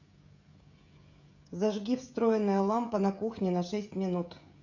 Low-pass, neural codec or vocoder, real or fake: 7.2 kHz; codec, 16 kHz, 16 kbps, FreqCodec, smaller model; fake